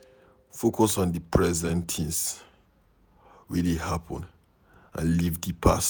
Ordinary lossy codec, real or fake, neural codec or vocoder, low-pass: none; fake; vocoder, 48 kHz, 128 mel bands, Vocos; none